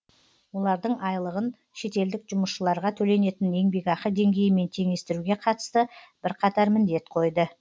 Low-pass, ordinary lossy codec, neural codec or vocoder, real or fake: none; none; none; real